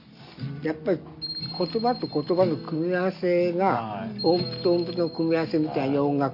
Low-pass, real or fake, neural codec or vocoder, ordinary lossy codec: 5.4 kHz; real; none; MP3, 32 kbps